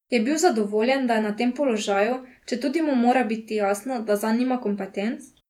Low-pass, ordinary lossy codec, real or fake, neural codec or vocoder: 19.8 kHz; none; fake; vocoder, 48 kHz, 128 mel bands, Vocos